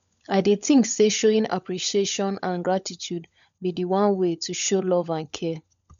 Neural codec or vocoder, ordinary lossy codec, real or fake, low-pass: codec, 16 kHz, 16 kbps, FunCodec, trained on LibriTTS, 50 frames a second; none; fake; 7.2 kHz